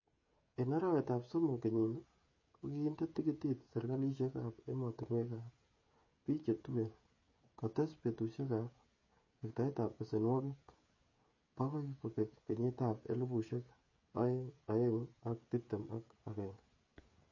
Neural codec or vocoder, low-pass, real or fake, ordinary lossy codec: codec, 16 kHz, 16 kbps, FreqCodec, smaller model; 7.2 kHz; fake; MP3, 32 kbps